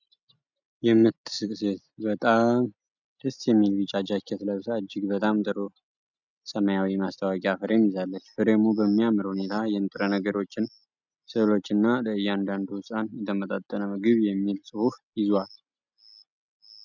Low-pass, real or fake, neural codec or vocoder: 7.2 kHz; real; none